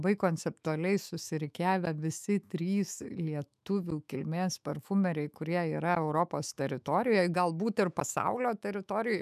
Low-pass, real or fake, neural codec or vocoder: 14.4 kHz; fake; autoencoder, 48 kHz, 128 numbers a frame, DAC-VAE, trained on Japanese speech